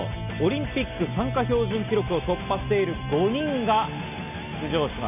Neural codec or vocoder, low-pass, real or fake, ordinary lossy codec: none; 3.6 kHz; real; none